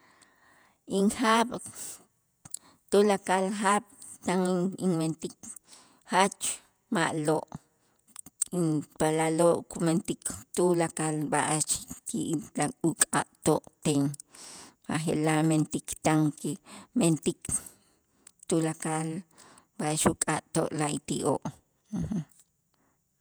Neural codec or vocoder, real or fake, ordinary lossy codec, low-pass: vocoder, 48 kHz, 128 mel bands, Vocos; fake; none; none